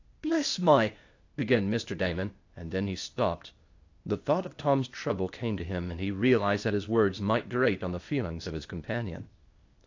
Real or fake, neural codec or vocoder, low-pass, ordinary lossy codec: fake; codec, 16 kHz, 0.8 kbps, ZipCodec; 7.2 kHz; AAC, 48 kbps